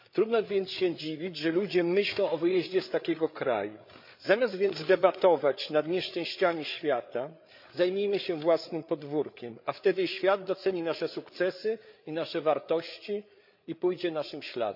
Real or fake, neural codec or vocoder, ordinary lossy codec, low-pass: fake; codec, 16 kHz, 8 kbps, FreqCodec, larger model; MP3, 48 kbps; 5.4 kHz